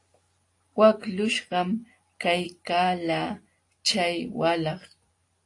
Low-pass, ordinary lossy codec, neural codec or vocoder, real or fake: 10.8 kHz; AAC, 48 kbps; none; real